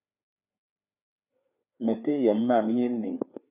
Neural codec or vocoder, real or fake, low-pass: codec, 16 kHz, 4 kbps, FreqCodec, larger model; fake; 3.6 kHz